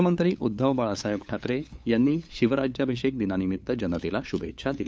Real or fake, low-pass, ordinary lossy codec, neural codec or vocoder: fake; none; none; codec, 16 kHz, 8 kbps, FunCodec, trained on LibriTTS, 25 frames a second